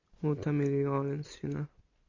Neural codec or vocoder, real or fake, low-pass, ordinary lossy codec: none; real; 7.2 kHz; MP3, 48 kbps